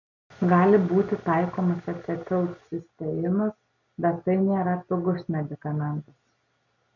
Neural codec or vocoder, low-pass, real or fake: none; 7.2 kHz; real